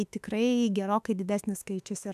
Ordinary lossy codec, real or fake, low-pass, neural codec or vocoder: AAC, 96 kbps; fake; 14.4 kHz; autoencoder, 48 kHz, 32 numbers a frame, DAC-VAE, trained on Japanese speech